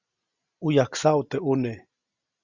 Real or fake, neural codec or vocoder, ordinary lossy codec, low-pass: real; none; Opus, 64 kbps; 7.2 kHz